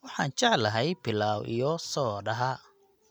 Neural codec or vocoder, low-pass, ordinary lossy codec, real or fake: none; none; none; real